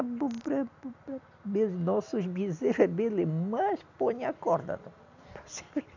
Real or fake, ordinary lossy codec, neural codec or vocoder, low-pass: real; none; none; 7.2 kHz